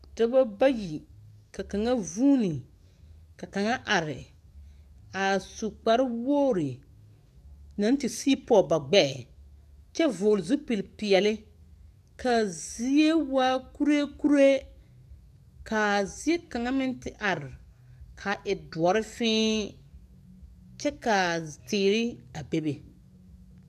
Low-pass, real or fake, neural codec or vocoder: 14.4 kHz; fake; codec, 44.1 kHz, 7.8 kbps, DAC